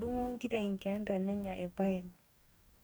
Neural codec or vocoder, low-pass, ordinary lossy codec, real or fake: codec, 44.1 kHz, 2.6 kbps, DAC; none; none; fake